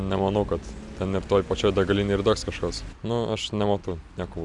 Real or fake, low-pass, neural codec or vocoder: real; 10.8 kHz; none